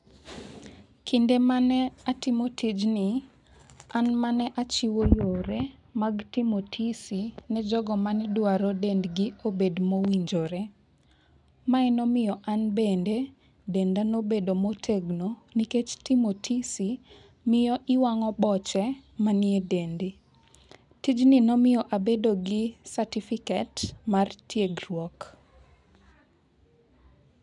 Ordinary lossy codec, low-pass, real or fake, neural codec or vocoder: none; 10.8 kHz; real; none